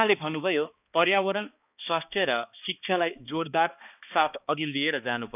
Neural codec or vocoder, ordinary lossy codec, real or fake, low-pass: codec, 16 kHz, 2 kbps, X-Codec, HuBERT features, trained on balanced general audio; none; fake; 3.6 kHz